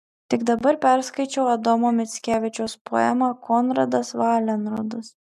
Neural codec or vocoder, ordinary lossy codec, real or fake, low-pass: none; MP3, 96 kbps; real; 14.4 kHz